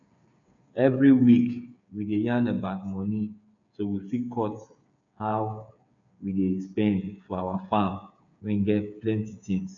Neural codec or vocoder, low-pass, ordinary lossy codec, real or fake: codec, 16 kHz, 8 kbps, FreqCodec, smaller model; 7.2 kHz; none; fake